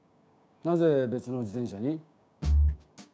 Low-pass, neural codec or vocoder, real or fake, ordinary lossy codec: none; codec, 16 kHz, 6 kbps, DAC; fake; none